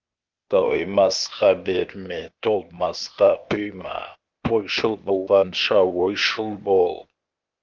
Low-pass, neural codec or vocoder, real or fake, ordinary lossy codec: 7.2 kHz; codec, 16 kHz, 0.8 kbps, ZipCodec; fake; Opus, 32 kbps